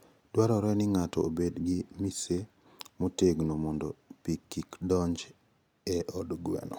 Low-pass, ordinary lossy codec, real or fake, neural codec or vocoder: none; none; real; none